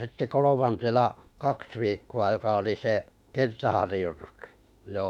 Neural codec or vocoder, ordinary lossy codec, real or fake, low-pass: autoencoder, 48 kHz, 32 numbers a frame, DAC-VAE, trained on Japanese speech; none; fake; 19.8 kHz